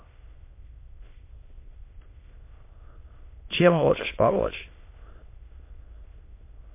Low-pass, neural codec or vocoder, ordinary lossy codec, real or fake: 3.6 kHz; autoencoder, 22.05 kHz, a latent of 192 numbers a frame, VITS, trained on many speakers; MP3, 24 kbps; fake